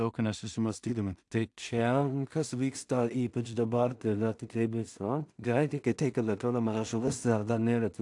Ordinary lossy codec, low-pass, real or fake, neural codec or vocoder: AAC, 64 kbps; 10.8 kHz; fake; codec, 16 kHz in and 24 kHz out, 0.4 kbps, LongCat-Audio-Codec, two codebook decoder